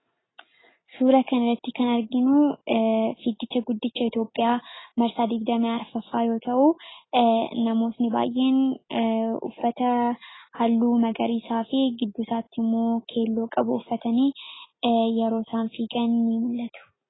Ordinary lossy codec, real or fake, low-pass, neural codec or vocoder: AAC, 16 kbps; real; 7.2 kHz; none